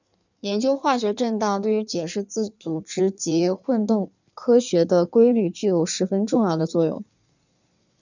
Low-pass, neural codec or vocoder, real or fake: 7.2 kHz; codec, 16 kHz in and 24 kHz out, 1.1 kbps, FireRedTTS-2 codec; fake